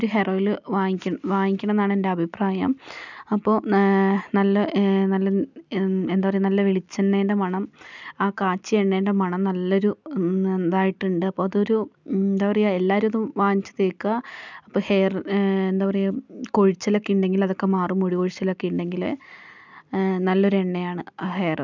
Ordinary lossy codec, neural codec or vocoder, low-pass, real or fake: none; none; 7.2 kHz; real